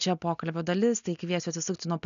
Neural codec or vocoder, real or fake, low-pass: none; real; 7.2 kHz